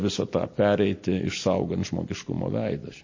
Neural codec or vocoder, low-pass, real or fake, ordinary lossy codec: none; 7.2 kHz; real; MP3, 32 kbps